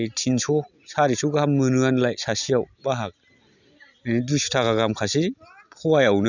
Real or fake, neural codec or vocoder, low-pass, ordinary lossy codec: real; none; 7.2 kHz; none